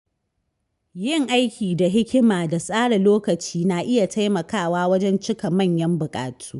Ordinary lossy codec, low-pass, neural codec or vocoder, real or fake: MP3, 96 kbps; 10.8 kHz; none; real